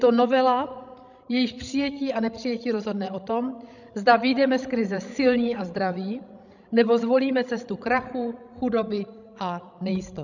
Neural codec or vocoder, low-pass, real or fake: codec, 16 kHz, 16 kbps, FreqCodec, larger model; 7.2 kHz; fake